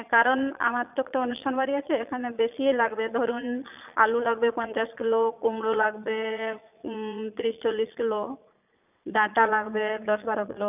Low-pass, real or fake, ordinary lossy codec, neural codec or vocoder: 3.6 kHz; fake; none; vocoder, 22.05 kHz, 80 mel bands, Vocos